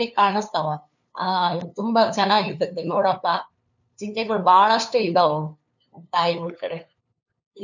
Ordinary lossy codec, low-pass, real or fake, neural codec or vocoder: none; 7.2 kHz; fake; codec, 16 kHz, 2 kbps, FunCodec, trained on LibriTTS, 25 frames a second